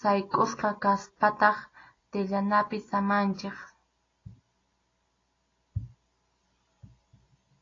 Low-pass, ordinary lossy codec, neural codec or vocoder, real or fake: 7.2 kHz; AAC, 32 kbps; none; real